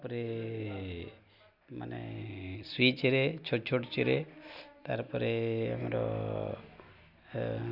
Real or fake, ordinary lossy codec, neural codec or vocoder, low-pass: real; none; none; 5.4 kHz